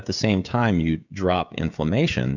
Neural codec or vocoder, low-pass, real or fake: codec, 16 kHz, 16 kbps, FreqCodec, smaller model; 7.2 kHz; fake